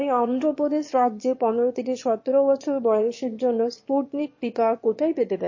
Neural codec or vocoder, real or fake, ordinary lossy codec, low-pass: autoencoder, 22.05 kHz, a latent of 192 numbers a frame, VITS, trained on one speaker; fake; MP3, 32 kbps; 7.2 kHz